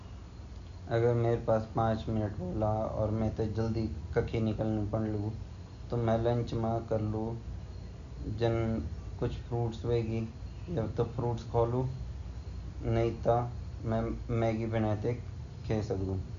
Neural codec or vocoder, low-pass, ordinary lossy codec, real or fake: none; 7.2 kHz; MP3, 96 kbps; real